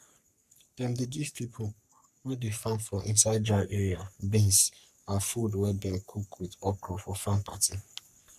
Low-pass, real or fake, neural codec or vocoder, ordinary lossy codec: 14.4 kHz; fake; codec, 44.1 kHz, 3.4 kbps, Pupu-Codec; none